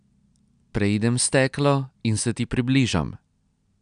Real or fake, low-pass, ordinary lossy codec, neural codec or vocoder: real; 9.9 kHz; none; none